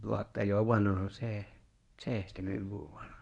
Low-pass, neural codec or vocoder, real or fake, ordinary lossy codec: 10.8 kHz; codec, 24 kHz, 0.9 kbps, WavTokenizer, medium speech release version 1; fake; none